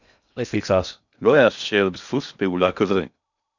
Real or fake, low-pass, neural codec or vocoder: fake; 7.2 kHz; codec, 16 kHz in and 24 kHz out, 0.6 kbps, FocalCodec, streaming, 2048 codes